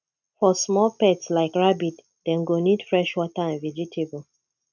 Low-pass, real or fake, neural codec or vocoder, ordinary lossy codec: 7.2 kHz; real; none; none